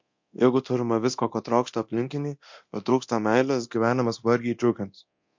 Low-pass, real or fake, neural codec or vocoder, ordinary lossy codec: 7.2 kHz; fake; codec, 24 kHz, 0.9 kbps, DualCodec; MP3, 48 kbps